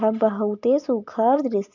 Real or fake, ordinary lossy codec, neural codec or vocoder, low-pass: real; none; none; 7.2 kHz